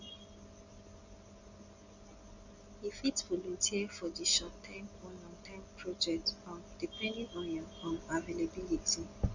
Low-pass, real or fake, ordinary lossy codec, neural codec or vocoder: 7.2 kHz; real; Opus, 64 kbps; none